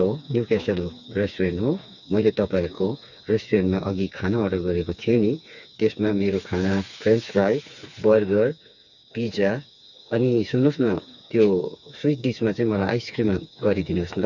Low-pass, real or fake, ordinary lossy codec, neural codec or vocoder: 7.2 kHz; fake; none; codec, 16 kHz, 4 kbps, FreqCodec, smaller model